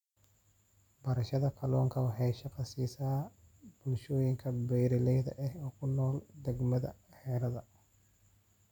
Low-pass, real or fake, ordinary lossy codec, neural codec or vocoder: 19.8 kHz; real; none; none